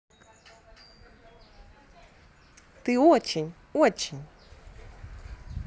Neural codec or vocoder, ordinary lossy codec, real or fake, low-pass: none; none; real; none